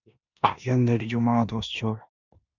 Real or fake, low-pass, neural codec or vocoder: fake; 7.2 kHz; codec, 16 kHz in and 24 kHz out, 0.9 kbps, LongCat-Audio-Codec, four codebook decoder